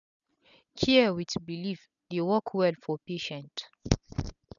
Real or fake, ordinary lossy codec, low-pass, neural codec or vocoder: real; none; 7.2 kHz; none